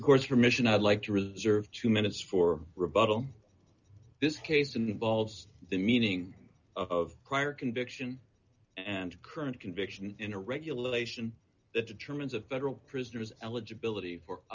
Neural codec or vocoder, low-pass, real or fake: none; 7.2 kHz; real